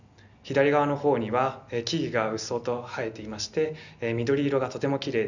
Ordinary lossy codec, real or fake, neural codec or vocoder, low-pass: none; real; none; 7.2 kHz